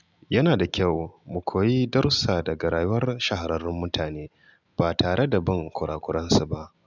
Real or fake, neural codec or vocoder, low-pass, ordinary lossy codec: real; none; 7.2 kHz; none